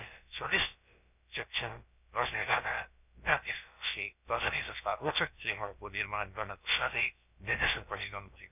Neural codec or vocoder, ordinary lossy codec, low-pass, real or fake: codec, 16 kHz, about 1 kbps, DyCAST, with the encoder's durations; none; 3.6 kHz; fake